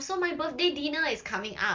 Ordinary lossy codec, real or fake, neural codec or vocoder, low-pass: Opus, 32 kbps; real; none; 7.2 kHz